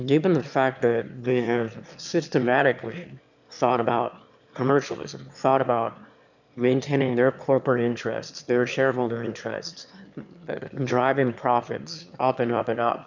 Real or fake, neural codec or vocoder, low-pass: fake; autoencoder, 22.05 kHz, a latent of 192 numbers a frame, VITS, trained on one speaker; 7.2 kHz